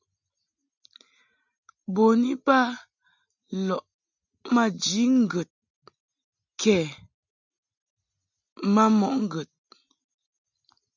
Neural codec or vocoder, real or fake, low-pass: none; real; 7.2 kHz